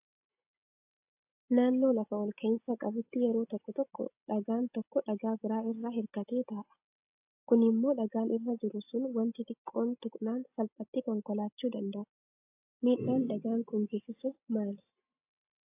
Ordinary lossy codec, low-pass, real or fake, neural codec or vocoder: AAC, 32 kbps; 3.6 kHz; real; none